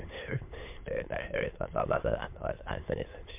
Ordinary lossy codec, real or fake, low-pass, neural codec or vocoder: MP3, 32 kbps; fake; 3.6 kHz; autoencoder, 22.05 kHz, a latent of 192 numbers a frame, VITS, trained on many speakers